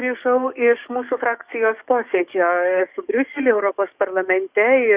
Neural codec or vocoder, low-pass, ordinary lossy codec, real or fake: codec, 44.1 kHz, 7.8 kbps, DAC; 3.6 kHz; Opus, 64 kbps; fake